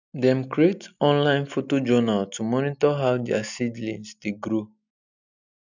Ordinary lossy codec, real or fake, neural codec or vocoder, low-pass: none; real; none; 7.2 kHz